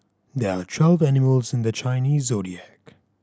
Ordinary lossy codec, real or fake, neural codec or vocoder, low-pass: none; real; none; none